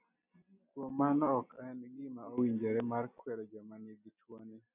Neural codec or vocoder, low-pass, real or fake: none; 3.6 kHz; real